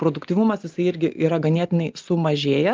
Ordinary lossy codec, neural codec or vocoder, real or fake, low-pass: Opus, 24 kbps; none; real; 7.2 kHz